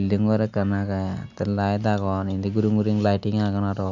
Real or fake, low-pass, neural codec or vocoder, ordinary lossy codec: real; 7.2 kHz; none; none